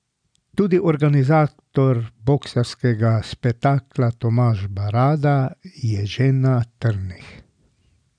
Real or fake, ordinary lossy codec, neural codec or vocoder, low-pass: real; none; none; 9.9 kHz